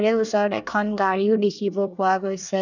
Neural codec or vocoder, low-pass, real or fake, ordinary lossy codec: codec, 24 kHz, 1 kbps, SNAC; 7.2 kHz; fake; none